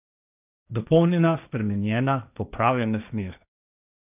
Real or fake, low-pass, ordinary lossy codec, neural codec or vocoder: fake; 3.6 kHz; none; codec, 16 kHz, 1.1 kbps, Voila-Tokenizer